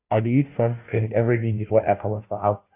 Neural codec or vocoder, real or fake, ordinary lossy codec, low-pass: codec, 16 kHz, 0.5 kbps, FunCodec, trained on Chinese and English, 25 frames a second; fake; AAC, 32 kbps; 3.6 kHz